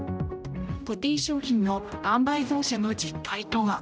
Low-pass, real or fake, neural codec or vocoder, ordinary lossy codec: none; fake; codec, 16 kHz, 0.5 kbps, X-Codec, HuBERT features, trained on general audio; none